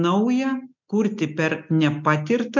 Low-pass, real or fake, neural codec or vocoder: 7.2 kHz; real; none